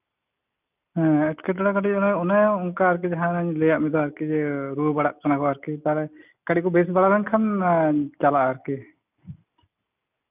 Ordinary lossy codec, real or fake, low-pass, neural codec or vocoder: none; real; 3.6 kHz; none